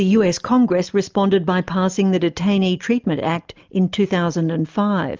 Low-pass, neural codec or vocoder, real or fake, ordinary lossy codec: 7.2 kHz; none; real; Opus, 24 kbps